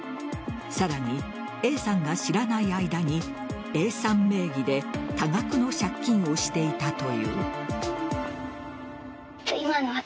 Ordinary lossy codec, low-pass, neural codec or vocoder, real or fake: none; none; none; real